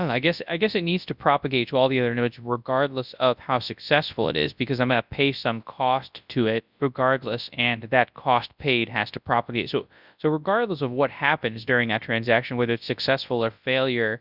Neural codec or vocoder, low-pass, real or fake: codec, 24 kHz, 0.9 kbps, WavTokenizer, large speech release; 5.4 kHz; fake